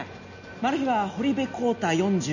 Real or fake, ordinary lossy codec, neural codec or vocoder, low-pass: real; none; none; 7.2 kHz